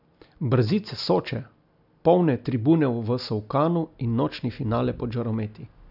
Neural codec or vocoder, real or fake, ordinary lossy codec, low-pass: none; real; none; 5.4 kHz